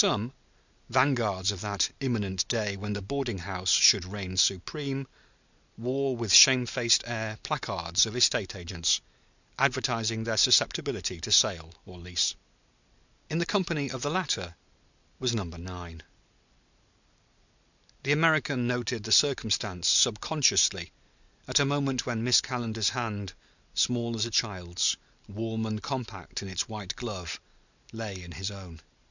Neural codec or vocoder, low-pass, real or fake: none; 7.2 kHz; real